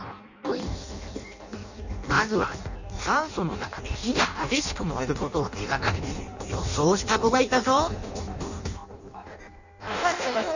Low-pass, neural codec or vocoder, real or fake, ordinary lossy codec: 7.2 kHz; codec, 16 kHz in and 24 kHz out, 0.6 kbps, FireRedTTS-2 codec; fake; none